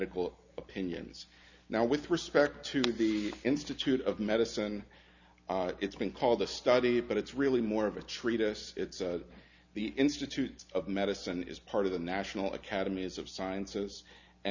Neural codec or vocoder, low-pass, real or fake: none; 7.2 kHz; real